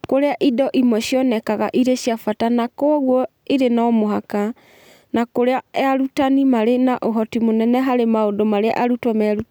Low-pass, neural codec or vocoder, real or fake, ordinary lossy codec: none; none; real; none